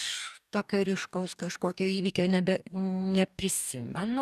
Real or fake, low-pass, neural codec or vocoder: fake; 14.4 kHz; codec, 44.1 kHz, 2.6 kbps, DAC